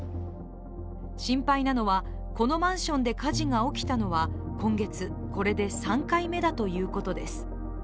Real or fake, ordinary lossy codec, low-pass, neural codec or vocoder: real; none; none; none